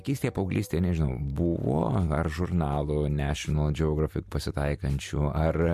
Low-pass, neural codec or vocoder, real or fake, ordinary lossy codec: 14.4 kHz; none; real; MP3, 64 kbps